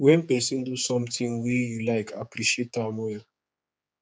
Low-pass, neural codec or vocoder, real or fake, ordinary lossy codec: none; codec, 16 kHz, 4 kbps, X-Codec, HuBERT features, trained on general audio; fake; none